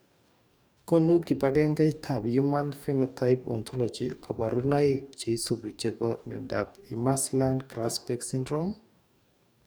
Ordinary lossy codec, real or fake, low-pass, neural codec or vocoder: none; fake; none; codec, 44.1 kHz, 2.6 kbps, DAC